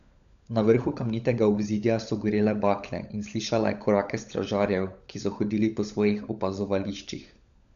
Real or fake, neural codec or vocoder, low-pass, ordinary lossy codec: fake; codec, 16 kHz, 16 kbps, FunCodec, trained on LibriTTS, 50 frames a second; 7.2 kHz; none